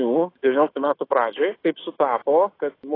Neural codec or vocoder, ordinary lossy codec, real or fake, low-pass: autoencoder, 48 kHz, 128 numbers a frame, DAC-VAE, trained on Japanese speech; AAC, 24 kbps; fake; 5.4 kHz